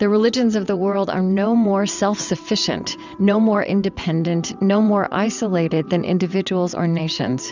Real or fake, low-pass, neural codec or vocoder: fake; 7.2 kHz; vocoder, 22.05 kHz, 80 mel bands, WaveNeXt